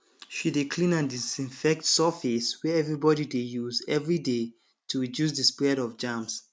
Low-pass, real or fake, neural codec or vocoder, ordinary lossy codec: none; real; none; none